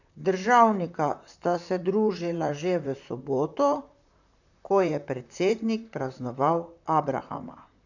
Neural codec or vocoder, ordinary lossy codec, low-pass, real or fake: vocoder, 44.1 kHz, 128 mel bands, Pupu-Vocoder; none; 7.2 kHz; fake